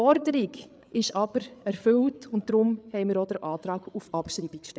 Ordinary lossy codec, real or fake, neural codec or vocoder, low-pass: none; fake; codec, 16 kHz, 8 kbps, FreqCodec, larger model; none